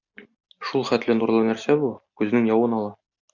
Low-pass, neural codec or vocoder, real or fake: 7.2 kHz; none; real